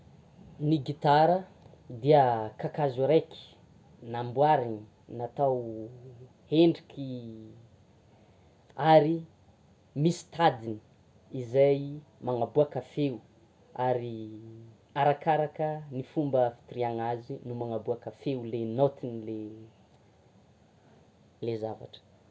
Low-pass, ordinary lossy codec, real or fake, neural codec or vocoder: none; none; real; none